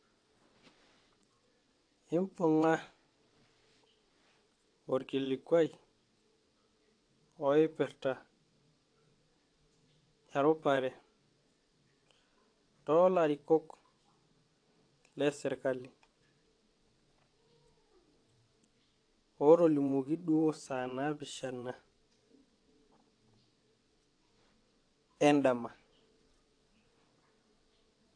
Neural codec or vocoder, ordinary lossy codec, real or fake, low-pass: vocoder, 22.05 kHz, 80 mel bands, WaveNeXt; AAC, 48 kbps; fake; 9.9 kHz